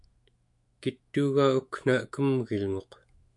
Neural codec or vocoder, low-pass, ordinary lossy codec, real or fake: autoencoder, 48 kHz, 128 numbers a frame, DAC-VAE, trained on Japanese speech; 10.8 kHz; MP3, 64 kbps; fake